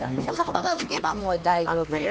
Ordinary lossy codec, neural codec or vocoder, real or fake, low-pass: none; codec, 16 kHz, 2 kbps, X-Codec, HuBERT features, trained on LibriSpeech; fake; none